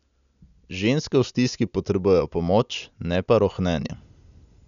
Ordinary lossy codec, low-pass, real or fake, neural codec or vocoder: none; 7.2 kHz; real; none